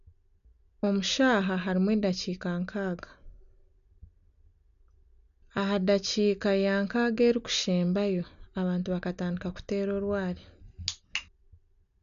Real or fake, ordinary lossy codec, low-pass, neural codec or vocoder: real; none; 7.2 kHz; none